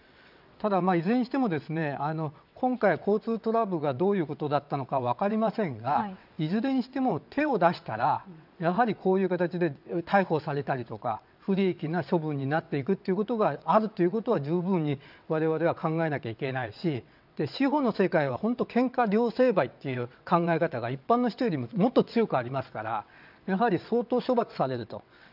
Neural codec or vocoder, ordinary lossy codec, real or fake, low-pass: vocoder, 22.05 kHz, 80 mel bands, WaveNeXt; none; fake; 5.4 kHz